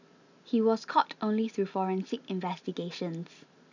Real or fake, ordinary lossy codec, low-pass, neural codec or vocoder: real; none; 7.2 kHz; none